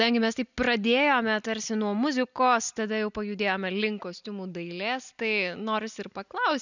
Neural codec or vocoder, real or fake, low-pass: none; real; 7.2 kHz